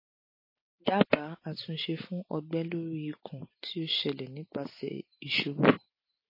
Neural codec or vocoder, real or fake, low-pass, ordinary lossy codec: none; real; 5.4 kHz; MP3, 24 kbps